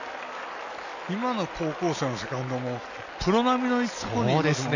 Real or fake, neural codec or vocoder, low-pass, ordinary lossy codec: real; none; 7.2 kHz; none